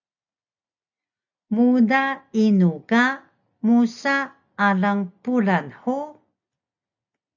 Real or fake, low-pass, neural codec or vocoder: real; 7.2 kHz; none